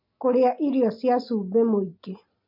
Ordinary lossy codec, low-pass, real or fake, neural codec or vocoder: MP3, 32 kbps; 5.4 kHz; real; none